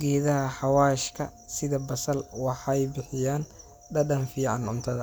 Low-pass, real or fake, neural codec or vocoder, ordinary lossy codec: none; real; none; none